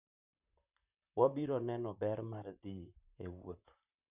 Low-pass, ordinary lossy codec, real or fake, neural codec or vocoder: 3.6 kHz; none; fake; vocoder, 44.1 kHz, 128 mel bands, Pupu-Vocoder